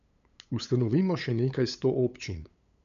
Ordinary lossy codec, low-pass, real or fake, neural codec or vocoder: none; 7.2 kHz; fake; codec, 16 kHz, 8 kbps, FunCodec, trained on LibriTTS, 25 frames a second